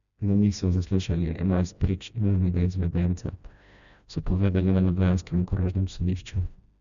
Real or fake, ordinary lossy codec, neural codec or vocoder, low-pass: fake; none; codec, 16 kHz, 1 kbps, FreqCodec, smaller model; 7.2 kHz